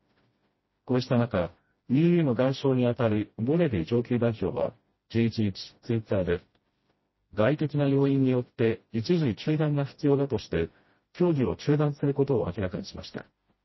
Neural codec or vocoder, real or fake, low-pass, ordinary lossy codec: codec, 16 kHz, 1 kbps, FreqCodec, smaller model; fake; 7.2 kHz; MP3, 24 kbps